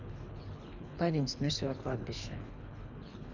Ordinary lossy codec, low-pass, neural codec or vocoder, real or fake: none; 7.2 kHz; codec, 24 kHz, 3 kbps, HILCodec; fake